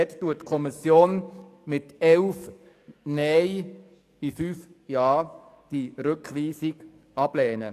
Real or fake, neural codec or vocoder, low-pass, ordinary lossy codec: fake; codec, 44.1 kHz, 7.8 kbps, DAC; 14.4 kHz; AAC, 64 kbps